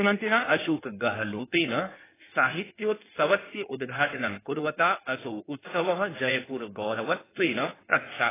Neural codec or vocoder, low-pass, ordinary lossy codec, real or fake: codec, 16 kHz in and 24 kHz out, 1.1 kbps, FireRedTTS-2 codec; 3.6 kHz; AAC, 16 kbps; fake